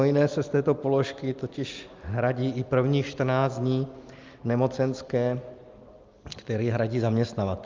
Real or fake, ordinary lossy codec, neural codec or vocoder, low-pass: real; Opus, 24 kbps; none; 7.2 kHz